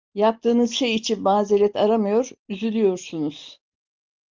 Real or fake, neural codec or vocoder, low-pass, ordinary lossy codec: real; none; 7.2 kHz; Opus, 32 kbps